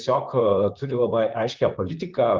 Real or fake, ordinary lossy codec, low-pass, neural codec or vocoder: fake; Opus, 32 kbps; 7.2 kHz; vocoder, 44.1 kHz, 128 mel bands, Pupu-Vocoder